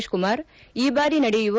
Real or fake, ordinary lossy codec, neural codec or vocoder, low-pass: real; none; none; none